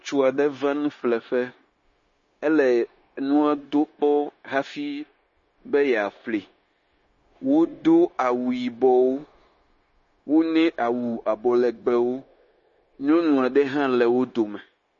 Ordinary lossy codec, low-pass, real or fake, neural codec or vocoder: MP3, 32 kbps; 7.2 kHz; fake; codec, 16 kHz, 0.9 kbps, LongCat-Audio-Codec